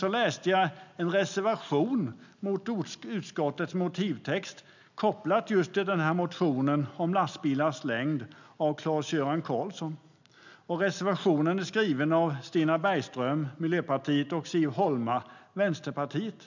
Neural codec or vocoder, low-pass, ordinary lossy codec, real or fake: none; 7.2 kHz; none; real